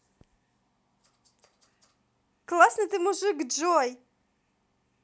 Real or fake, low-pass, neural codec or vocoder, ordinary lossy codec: real; none; none; none